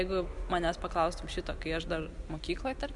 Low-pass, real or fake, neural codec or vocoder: 10.8 kHz; real; none